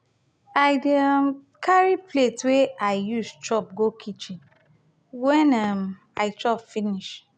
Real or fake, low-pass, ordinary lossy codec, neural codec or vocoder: real; 9.9 kHz; none; none